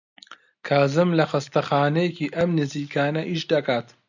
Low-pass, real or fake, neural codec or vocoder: 7.2 kHz; real; none